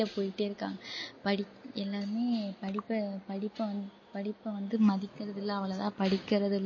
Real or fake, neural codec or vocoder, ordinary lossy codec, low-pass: real; none; MP3, 32 kbps; 7.2 kHz